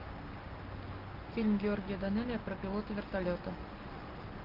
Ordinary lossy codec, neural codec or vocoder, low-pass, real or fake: Opus, 24 kbps; none; 5.4 kHz; real